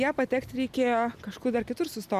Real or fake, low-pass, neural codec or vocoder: real; 14.4 kHz; none